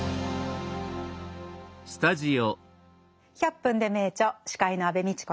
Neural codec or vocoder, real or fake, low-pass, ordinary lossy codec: none; real; none; none